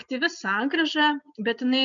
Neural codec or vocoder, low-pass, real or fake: none; 7.2 kHz; real